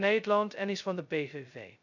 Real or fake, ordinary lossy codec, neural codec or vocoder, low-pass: fake; none; codec, 16 kHz, 0.2 kbps, FocalCodec; 7.2 kHz